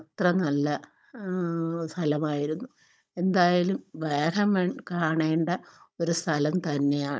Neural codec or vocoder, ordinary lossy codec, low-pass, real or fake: codec, 16 kHz, 16 kbps, FunCodec, trained on Chinese and English, 50 frames a second; none; none; fake